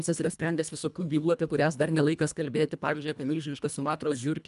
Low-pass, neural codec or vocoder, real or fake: 10.8 kHz; codec, 24 kHz, 1.5 kbps, HILCodec; fake